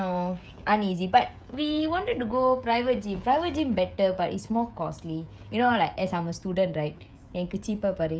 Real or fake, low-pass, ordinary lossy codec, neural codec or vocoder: fake; none; none; codec, 16 kHz, 16 kbps, FreqCodec, smaller model